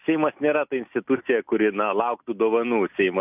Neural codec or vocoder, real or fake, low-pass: none; real; 3.6 kHz